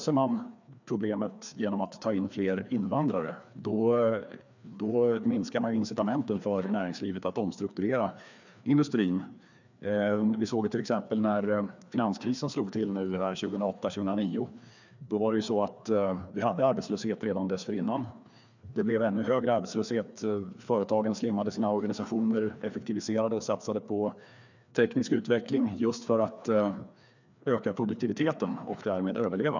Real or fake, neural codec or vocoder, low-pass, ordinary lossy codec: fake; codec, 16 kHz, 2 kbps, FreqCodec, larger model; 7.2 kHz; none